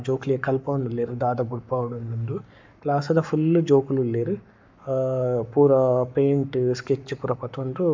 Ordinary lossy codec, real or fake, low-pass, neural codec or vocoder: MP3, 64 kbps; fake; 7.2 kHz; codec, 44.1 kHz, 7.8 kbps, Pupu-Codec